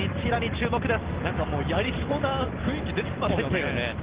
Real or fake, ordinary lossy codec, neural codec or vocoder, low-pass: real; Opus, 16 kbps; none; 3.6 kHz